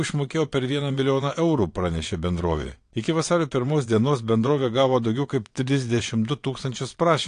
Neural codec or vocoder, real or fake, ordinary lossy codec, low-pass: vocoder, 22.05 kHz, 80 mel bands, WaveNeXt; fake; AAC, 48 kbps; 9.9 kHz